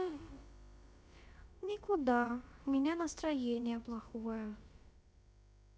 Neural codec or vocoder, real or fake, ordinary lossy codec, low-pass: codec, 16 kHz, about 1 kbps, DyCAST, with the encoder's durations; fake; none; none